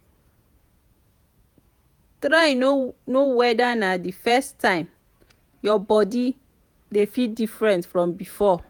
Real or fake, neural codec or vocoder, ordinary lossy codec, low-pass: fake; vocoder, 48 kHz, 128 mel bands, Vocos; none; none